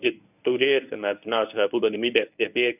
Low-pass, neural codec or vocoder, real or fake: 3.6 kHz; codec, 24 kHz, 0.9 kbps, WavTokenizer, medium speech release version 1; fake